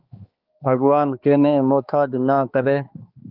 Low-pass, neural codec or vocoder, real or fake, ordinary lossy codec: 5.4 kHz; codec, 16 kHz, 2 kbps, X-Codec, HuBERT features, trained on balanced general audio; fake; Opus, 32 kbps